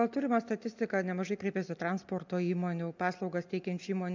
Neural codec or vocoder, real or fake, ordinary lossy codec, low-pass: none; real; MP3, 64 kbps; 7.2 kHz